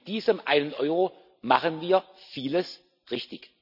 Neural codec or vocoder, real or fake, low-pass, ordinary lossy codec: none; real; 5.4 kHz; none